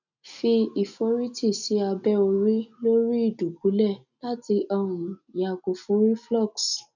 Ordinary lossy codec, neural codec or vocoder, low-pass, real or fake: none; none; 7.2 kHz; real